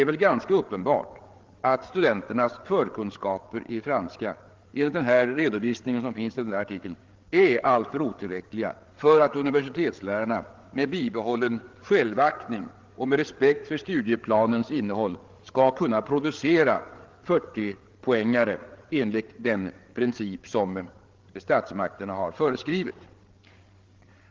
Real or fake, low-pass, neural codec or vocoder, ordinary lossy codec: fake; 7.2 kHz; codec, 16 kHz, 8 kbps, FreqCodec, larger model; Opus, 16 kbps